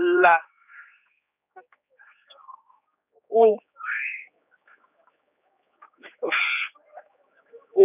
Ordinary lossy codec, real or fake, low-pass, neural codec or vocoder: none; fake; 3.6 kHz; codec, 16 kHz, 4 kbps, X-Codec, HuBERT features, trained on general audio